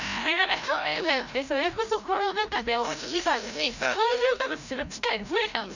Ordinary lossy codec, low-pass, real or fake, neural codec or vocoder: none; 7.2 kHz; fake; codec, 16 kHz, 0.5 kbps, FreqCodec, larger model